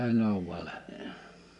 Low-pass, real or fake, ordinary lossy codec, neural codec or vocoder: 10.8 kHz; real; none; none